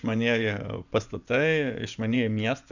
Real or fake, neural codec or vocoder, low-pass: fake; vocoder, 24 kHz, 100 mel bands, Vocos; 7.2 kHz